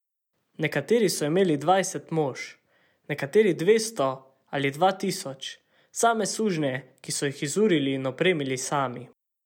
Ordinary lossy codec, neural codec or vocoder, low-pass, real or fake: none; none; 19.8 kHz; real